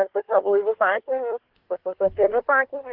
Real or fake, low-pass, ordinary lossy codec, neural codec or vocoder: fake; 5.4 kHz; Opus, 16 kbps; codec, 24 kHz, 1 kbps, SNAC